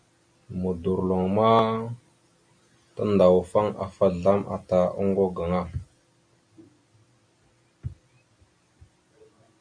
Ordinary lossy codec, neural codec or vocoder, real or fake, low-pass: AAC, 64 kbps; none; real; 9.9 kHz